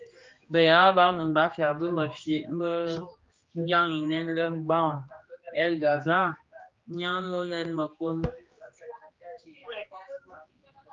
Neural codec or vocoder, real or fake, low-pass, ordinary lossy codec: codec, 16 kHz, 2 kbps, X-Codec, HuBERT features, trained on general audio; fake; 7.2 kHz; Opus, 32 kbps